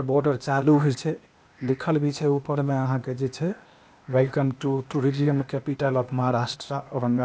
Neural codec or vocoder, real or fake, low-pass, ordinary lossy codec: codec, 16 kHz, 0.8 kbps, ZipCodec; fake; none; none